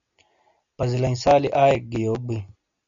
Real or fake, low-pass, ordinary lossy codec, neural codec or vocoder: real; 7.2 kHz; MP3, 64 kbps; none